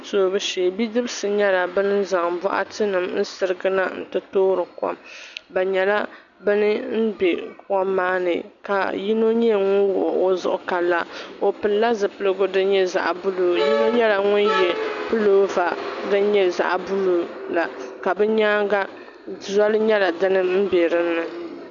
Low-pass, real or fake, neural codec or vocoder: 7.2 kHz; real; none